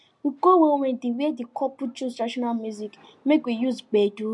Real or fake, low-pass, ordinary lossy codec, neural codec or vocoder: real; 10.8 kHz; MP3, 64 kbps; none